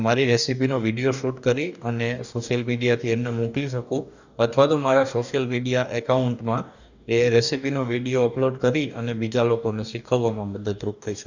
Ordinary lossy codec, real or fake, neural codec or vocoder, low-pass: none; fake; codec, 44.1 kHz, 2.6 kbps, DAC; 7.2 kHz